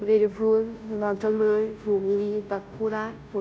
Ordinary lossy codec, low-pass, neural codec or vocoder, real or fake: none; none; codec, 16 kHz, 0.5 kbps, FunCodec, trained on Chinese and English, 25 frames a second; fake